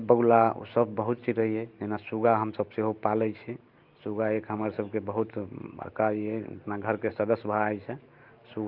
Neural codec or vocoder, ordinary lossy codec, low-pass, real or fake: none; Opus, 24 kbps; 5.4 kHz; real